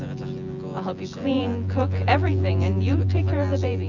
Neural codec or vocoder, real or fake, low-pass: vocoder, 24 kHz, 100 mel bands, Vocos; fake; 7.2 kHz